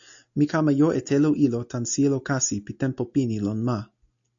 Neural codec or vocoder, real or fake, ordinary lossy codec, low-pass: none; real; AAC, 64 kbps; 7.2 kHz